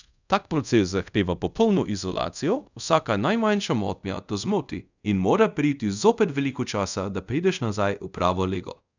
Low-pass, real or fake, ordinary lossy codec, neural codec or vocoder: 7.2 kHz; fake; none; codec, 24 kHz, 0.5 kbps, DualCodec